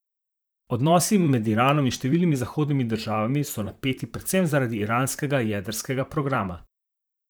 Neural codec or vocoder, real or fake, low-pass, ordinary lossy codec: vocoder, 44.1 kHz, 128 mel bands, Pupu-Vocoder; fake; none; none